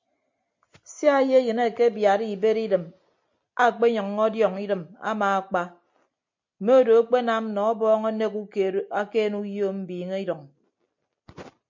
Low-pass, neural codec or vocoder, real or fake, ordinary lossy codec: 7.2 kHz; none; real; MP3, 48 kbps